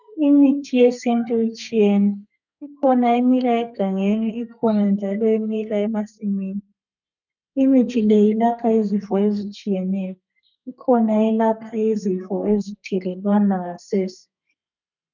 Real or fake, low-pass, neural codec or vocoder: fake; 7.2 kHz; codec, 32 kHz, 1.9 kbps, SNAC